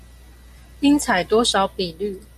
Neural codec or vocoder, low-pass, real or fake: none; 14.4 kHz; real